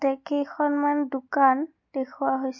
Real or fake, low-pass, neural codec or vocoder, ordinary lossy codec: real; 7.2 kHz; none; MP3, 48 kbps